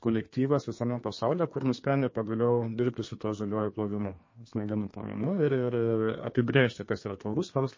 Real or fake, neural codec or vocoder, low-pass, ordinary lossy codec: fake; codec, 32 kHz, 1.9 kbps, SNAC; 7.2 kHz; MP3, 32 kbps